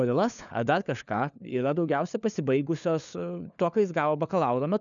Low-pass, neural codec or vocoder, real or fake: 7.2 kHz; codec, 16 kHz, 4 kbps, FunCodec, trained on LibriTTS, 50 frames a second; fake